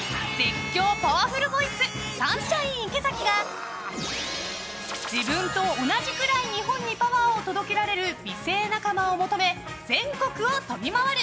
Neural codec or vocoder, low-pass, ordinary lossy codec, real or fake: none; none; none; real